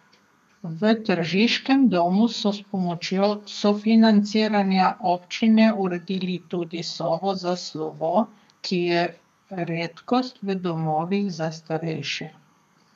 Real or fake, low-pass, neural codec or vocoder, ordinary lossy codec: fake; 14.4 kHz; codec, 32 kHz, 1.9 kbps, SNAC; none